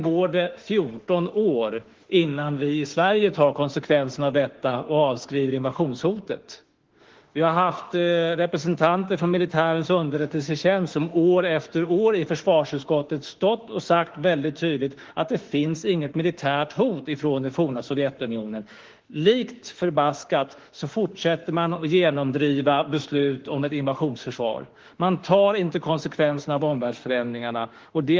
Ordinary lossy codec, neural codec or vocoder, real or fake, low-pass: Opus, 16 kbps; autoencoder, 48 kHz, 32 numbers a frame, DAC-VAE, trained on Japanese speech; fake; 7.2 kHz